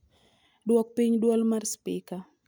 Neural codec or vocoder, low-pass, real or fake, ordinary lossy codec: none; none; real; none